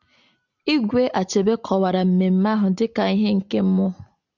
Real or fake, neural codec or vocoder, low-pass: real; none; 7.2 kHz